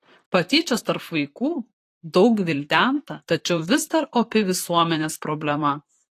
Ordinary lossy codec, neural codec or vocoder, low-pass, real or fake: AAC, 48 kbps; vocoder, 44.1 kHz, 128 mel bands, Pupu-Vocoder; 14.4 kHz; fake